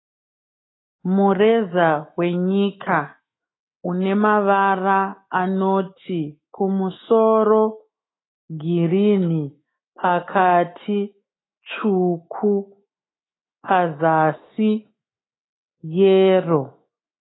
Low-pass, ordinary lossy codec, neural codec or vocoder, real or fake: 7.2 kHz; AAC, 16 kbps; autoencoder, 48 kHz, 128 numbers a frame, DAC-VAE, trained on Japanese speech; fake